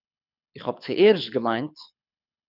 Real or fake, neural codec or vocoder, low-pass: fake; codec, 24 kHz, 6 kbps, HILCodec; 5.4 kHz